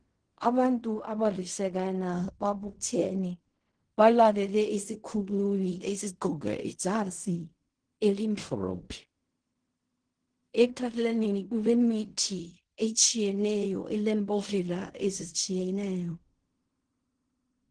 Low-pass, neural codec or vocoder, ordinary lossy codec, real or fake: 9.9 kHz; codec, 16 kHz in and 24 kHz out, 0.4 kbps, LongCat-Audio-Codec, fine tuned four codebook decoder; Opus, 16 kbps; fake